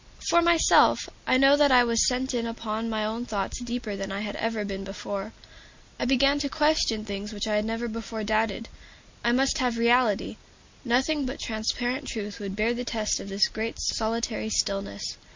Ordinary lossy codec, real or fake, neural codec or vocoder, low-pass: MP3, 48 kbps; real; none; 7.2 kHz